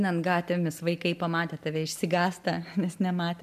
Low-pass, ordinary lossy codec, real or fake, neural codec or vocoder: 14.4 kHz; MP3, 96 kbps; real; none